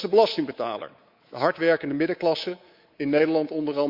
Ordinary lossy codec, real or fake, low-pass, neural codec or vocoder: Opus, 64 kbps; fake; 5.4 kHz; codec, 24 kHz, 3.1 kbps, DualCodec